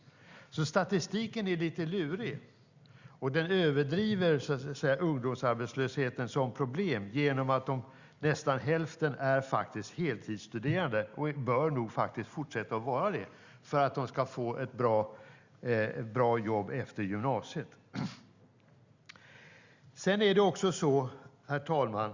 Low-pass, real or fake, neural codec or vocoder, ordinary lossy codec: 7.2 kHz; real; none; Opus, 64 kbps